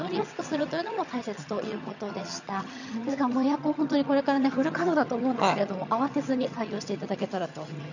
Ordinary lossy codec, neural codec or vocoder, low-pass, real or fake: none; vocoder, 22.05 kHz, 80 mel bands, HiFi-GAN; 7.2 kHz; fake